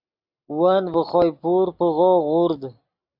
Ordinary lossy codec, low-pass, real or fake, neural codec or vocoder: AAC, 48 kbps; 5.4 kHz; real; none